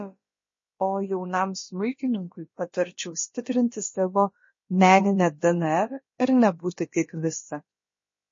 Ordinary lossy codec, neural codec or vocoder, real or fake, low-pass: MP3, 32 kbps; codec, 16 kHz, about 1 kbps, DyCAST, with the encoder's durations; fake; 7.2 kHz